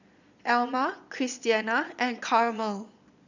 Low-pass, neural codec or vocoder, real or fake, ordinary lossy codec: 7.2 kHz; vocoder, 22.05 kHz, 80 mel bands, Vocos; fake; none